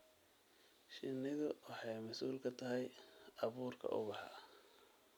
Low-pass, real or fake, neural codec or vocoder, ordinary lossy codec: none; real; none; none